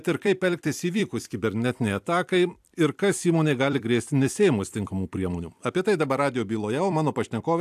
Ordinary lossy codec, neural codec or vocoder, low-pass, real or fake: AAC, 96 kbps; vocoder, 44.1 kHz, 128 mel bands every 256 samples, BigVGAN v2; 14.4 kHz; fake